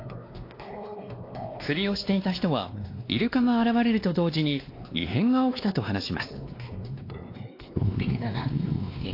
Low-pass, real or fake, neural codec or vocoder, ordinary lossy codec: 5.4 kHz; fake; codec, 16 kHz, 2 kbps, X-Codec, WavLM features, trained on Multilingual LibriSpeech; AAC, 32 kbps